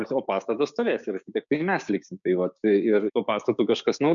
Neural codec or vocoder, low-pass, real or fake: codec, 16 kHz, 6 kbps, DAC; 7.2 kHz; fake